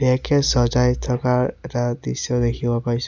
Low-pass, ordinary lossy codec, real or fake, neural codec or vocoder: 7.2 kHz; none; real; none